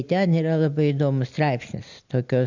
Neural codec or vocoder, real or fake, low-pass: none; real; 7.2 kHz